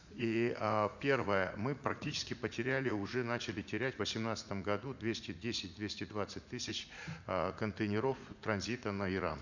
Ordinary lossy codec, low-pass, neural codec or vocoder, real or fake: none; 7.2 kHz; vocoder, 44.1 kHz, 80 mel bands, Vocos; fake